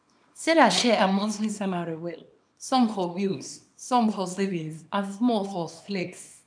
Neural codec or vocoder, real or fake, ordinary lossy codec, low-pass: codec, 24 kHz, 0.9 kbps, WavTokenizer, small release; fake; none; 9.9 kHz